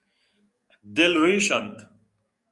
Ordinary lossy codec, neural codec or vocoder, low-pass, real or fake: Opus, 64 kbps; codec, 44.1 kHz, 7.8 kbps, DAC; 10.8 kHz; fake